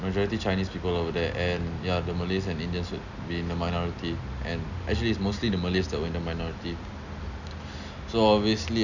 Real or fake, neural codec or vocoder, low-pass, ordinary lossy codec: real; none; 7.2 kHz; none